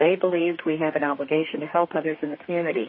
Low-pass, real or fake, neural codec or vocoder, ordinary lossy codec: 7.2 kHz; fake; codec, 32 kHz, 1.9 kbps, SNAC; MP3, 24 kbps